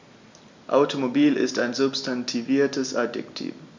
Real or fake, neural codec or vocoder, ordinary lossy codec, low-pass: real; none; MP3, 64 kbps; 7.2 kHz